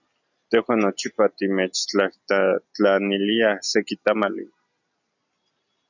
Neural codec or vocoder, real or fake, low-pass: none; real; 7.2 kHz